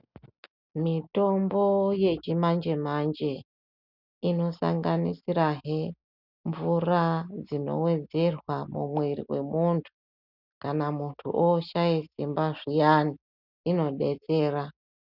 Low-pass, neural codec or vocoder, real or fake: 5.4 kHz; none; real